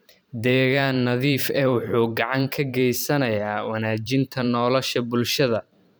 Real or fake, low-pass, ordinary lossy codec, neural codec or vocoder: fake; none; none; vocoder, 44.1 kHz, 128 mel bands every 512 samples, BigVGAN v2